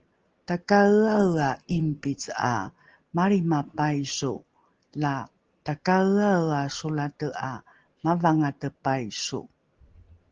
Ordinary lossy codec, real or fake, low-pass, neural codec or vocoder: Opus, 16 kbps; real; 7.2 kHz; none